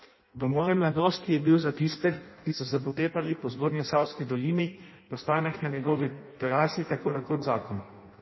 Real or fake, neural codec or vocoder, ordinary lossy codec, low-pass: fake; codec, 16 kHz in and 24 kHz out, 0.6 kbps, FireRedTTS-2 codec; MP3, 24 kbps; 7.2 kHz